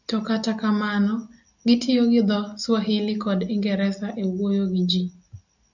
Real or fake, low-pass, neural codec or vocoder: real; 7.2 kHz; none